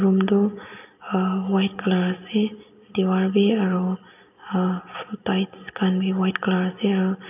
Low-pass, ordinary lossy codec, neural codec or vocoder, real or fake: 3.6 kHz; none; none; real